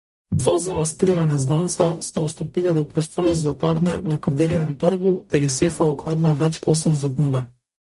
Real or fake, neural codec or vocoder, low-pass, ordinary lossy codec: fake; codec, 44.1 kHz, 0.9 kbps, DAC; 14.4 kHz; MP3, 48 kbps